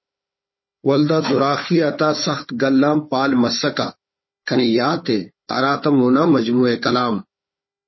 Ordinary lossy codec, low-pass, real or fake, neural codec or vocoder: MP3, 24 kbps; 7.2 kHz; fake; codec, 16 kHz, 4 kbps, FunCodec, trained on Chinese and English, 50 frames a second